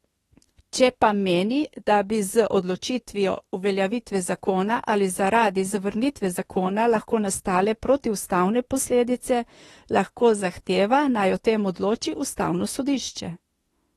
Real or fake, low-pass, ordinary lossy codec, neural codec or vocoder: fake; 19.8 kHz; AAC, 32 kbps; autoencoder, 48 kHz, 32 numbers a frame, DAC-VAE, trained on Japanese speech